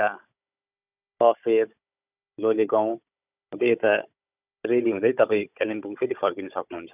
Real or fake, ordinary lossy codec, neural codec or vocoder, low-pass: fake; none; codec, 16 kHz, 16 kbps, FunCodec, trained on Chinese and English, 50 frames a second; 3.6 kHz